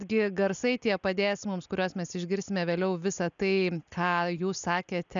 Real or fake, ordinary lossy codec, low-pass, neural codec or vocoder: real; MP3, 96 kbps; 7.2 kHz; none